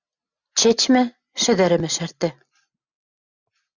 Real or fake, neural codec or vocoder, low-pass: real; none; 7.2 kHz